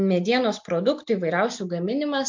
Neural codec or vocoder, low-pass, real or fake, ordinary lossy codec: none; 7.2 kHz; real; MP3, 48 kbps